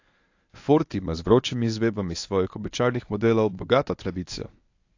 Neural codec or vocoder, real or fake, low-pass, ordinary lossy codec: codec, 24 kHz, 0.9 kbps, WavTokenizer, medium speech release version 1; fake; 7.2 kHz; AAC, 48 kbps